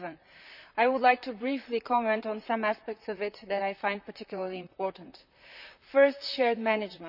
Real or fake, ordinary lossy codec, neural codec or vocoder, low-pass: fake; none; vocoder, 44.1 kHz, 128 mel bands, Pupu-Vocoder; 5.4 kHz